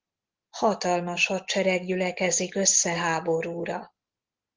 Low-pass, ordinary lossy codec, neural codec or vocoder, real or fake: 7.2 kHz; Opus, 16 kbps; none; real